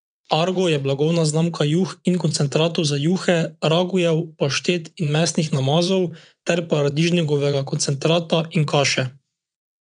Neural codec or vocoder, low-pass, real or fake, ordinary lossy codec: vocoder, 22.05 kHz, 80 mel bands, WaveNeXt; 9.9 kHz; fake; none